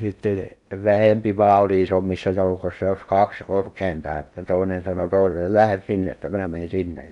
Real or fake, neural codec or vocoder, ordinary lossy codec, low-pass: fake; codec, 16 kHz in and 24 kHz out, 0.8 kbps, FocalCodec, streaming, 65536 codes; none; 10.8 kHz